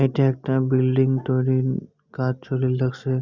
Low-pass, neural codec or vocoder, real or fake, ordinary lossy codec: 7.2 kHz; none; real; Opus, 64 kbps